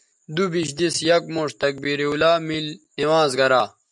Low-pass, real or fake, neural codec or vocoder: 10.8 kHz; real; none